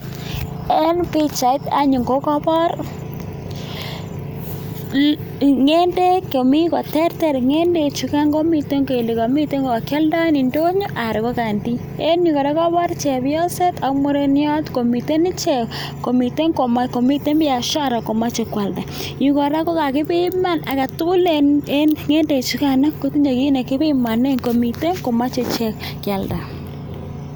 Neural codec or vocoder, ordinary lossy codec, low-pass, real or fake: none; none; none; real